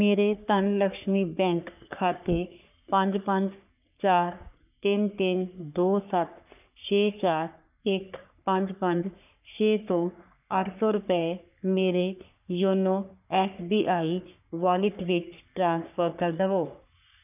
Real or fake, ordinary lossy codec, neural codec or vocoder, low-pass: fake; none; codec, 44.1 kHz, 3.4 kbps, Pupu-Codec; 3.6 kHz